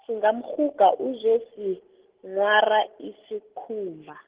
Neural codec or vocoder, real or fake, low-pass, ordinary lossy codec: none; real; 3.6 kHz; Opus, 16 kbps